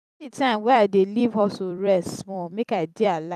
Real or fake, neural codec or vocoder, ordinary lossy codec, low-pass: fake; vocoder, 44.1 kHz, 128 mel bands every 256 samples, BigVGAN v2; none; 14.4 kHz